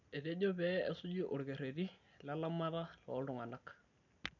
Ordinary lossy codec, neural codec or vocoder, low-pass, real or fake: none; none; 7.2 kHz; real